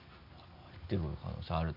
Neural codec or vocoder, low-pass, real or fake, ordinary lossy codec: none; 5.4 kHz; real; MP3, 32 kbps